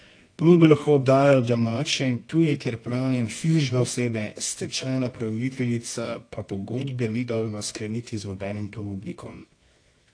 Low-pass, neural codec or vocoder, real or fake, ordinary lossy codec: 9.9 kHz; codec, 24 kHz, 0.9 kbps, WavTokenizer, medium music audio release; fake; AAC, 48 kbps